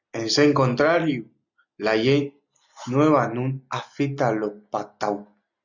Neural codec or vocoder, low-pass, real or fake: none; 7.2 kHz; real